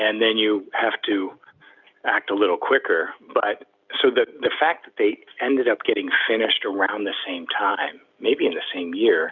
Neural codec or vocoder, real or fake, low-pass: none; real; 7.2 kHz